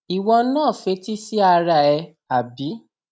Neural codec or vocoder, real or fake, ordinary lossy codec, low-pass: none; real; none; none